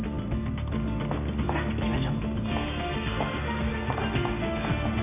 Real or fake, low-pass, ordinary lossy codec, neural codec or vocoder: real; 3.6 kHz; none; none